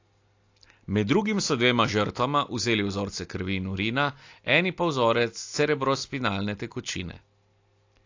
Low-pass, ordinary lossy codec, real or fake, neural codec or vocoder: 7.2 kHz; AAC, 48 kbps; real; none